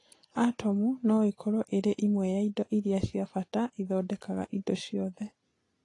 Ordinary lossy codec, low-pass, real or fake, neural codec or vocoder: AAC, 32 kbps; 10.8 kHz; real; none